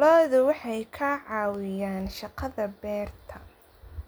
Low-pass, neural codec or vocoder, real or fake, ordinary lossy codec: none; none; real; none